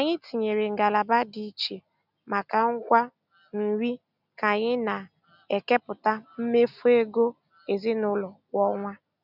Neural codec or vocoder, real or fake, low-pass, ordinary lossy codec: none; real; 5.4 kHz; none